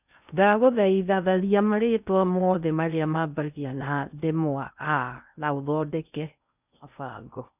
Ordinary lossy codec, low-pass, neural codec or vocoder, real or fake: none; 3.6 kHz; codec, 16 kHz in and 24 kHz out, 0.6 kbps, FocalCodec, streaming, 2048 codes; fake